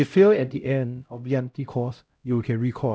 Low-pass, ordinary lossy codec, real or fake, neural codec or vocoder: none; none; fake; codec, 16 kHz, 0.5 kbps, X-Codec, HuBERT features, trained on LibriSpeech